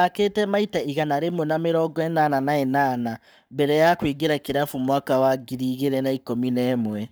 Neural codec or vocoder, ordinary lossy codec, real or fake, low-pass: codec, 44.1 kHz, 7.8 kbps, Pupu-Codec; none; fake; none